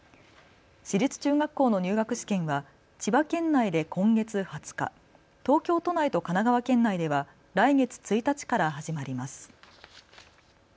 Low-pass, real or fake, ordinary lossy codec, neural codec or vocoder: none; real; none; none